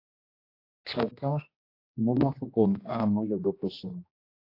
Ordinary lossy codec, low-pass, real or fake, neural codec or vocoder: AAC, 32 kbps; 5.4 kHz; fake; codec, 16 kHz, 1 kbps, X-Codec, HuBERT features, trained on general audio